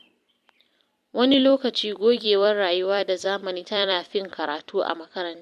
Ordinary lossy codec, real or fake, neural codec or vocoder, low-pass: MP3, 64 kbps; fake; vocoder, 44.1 kHz, 128 mel bands every 256 samples, BigVGAN v2; 14.4 kHz